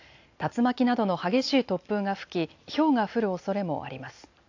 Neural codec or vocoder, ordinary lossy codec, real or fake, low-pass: none; AAC, 48 kbps; real; 7.2 kHz